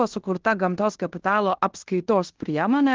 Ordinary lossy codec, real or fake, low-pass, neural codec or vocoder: Opus, 16 kbps; fake; 7.2 kHz; codec, 16 kHz in and 24 kHz out, 0.9 kbps, LongCat-Audio-Codec, fine tuned four codebook decoder